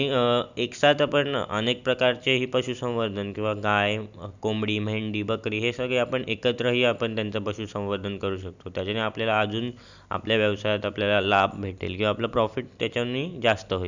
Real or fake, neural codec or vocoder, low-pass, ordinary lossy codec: real; none; 7.2 kHz; none